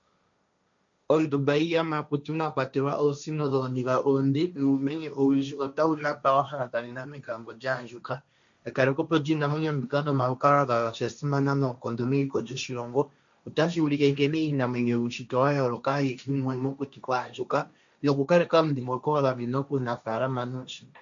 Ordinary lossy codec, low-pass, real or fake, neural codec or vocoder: MP3, 64 kbps; 7.2 kHz; fake; codec, 16 kHz, 1.1 kbps, Voila-Tokenizer